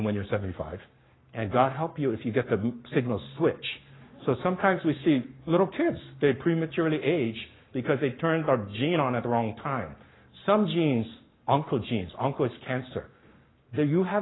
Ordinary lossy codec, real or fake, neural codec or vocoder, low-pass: AAC, 16 kbps; fake; codec, 44.1 kHz, 7.8 kbps, Pupu-Codec; 7.2 kHz